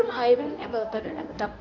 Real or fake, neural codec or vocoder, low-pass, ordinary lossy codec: fake; codec, 24 kHz, 0.9 kbps, WavTokenizer, medium speech release version 1; 7.2 kHz; none